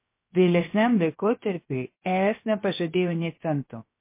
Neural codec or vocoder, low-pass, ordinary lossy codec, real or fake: codec, 16 kHz, 0.7 kbps, FocalCodec; 3.6 kHz; MP3, 24 kbps; fake